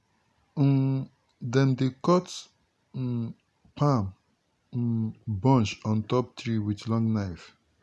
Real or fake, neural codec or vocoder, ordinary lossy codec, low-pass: real; none; none; none